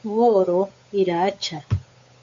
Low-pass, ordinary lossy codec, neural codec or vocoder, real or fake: 7.2 kHz; MP3, 48 kbps; codec, 16 kHz, 8 kbps, FunCodec, trained on Chinese and English, 25 frames a second; fake